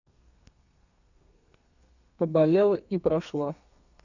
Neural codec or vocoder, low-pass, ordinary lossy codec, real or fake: codec, 32 kHz, 1.9 kbps, SNAC; 7.2 kHz; none; fake